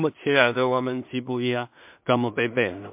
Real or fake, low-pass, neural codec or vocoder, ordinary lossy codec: fake; 3.6 kHz; codec, 16 kHz in and 24 kHz out, 0.4 kbps, LongCat-Audio-Codec, two codebook decoder; MP3, 32 kbps